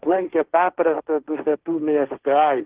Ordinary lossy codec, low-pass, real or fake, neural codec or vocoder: Opus, 16 kbps; 3.6 kHz; fake; codec, 16 kHz, 1.1 kbps, Voila-Tokenizer